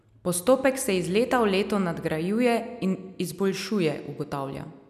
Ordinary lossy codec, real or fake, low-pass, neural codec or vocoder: none; real; 14.4 kHz; none